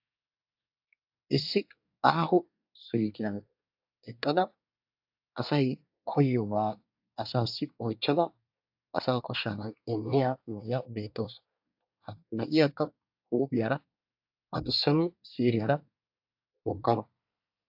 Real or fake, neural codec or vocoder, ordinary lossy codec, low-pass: fake; codec, 24 kHz, 1 kbps, SNAC; AAC, 48 kbps; 5.4 kHz